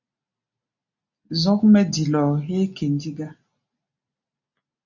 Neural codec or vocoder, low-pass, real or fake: none; 7.2 kHz; real